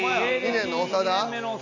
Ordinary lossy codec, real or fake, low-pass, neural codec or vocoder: none; real; 7.2 kHz; none